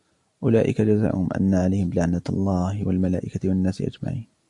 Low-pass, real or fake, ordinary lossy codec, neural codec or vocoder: 10.8 kHz; real; MP3, 64 kbps; none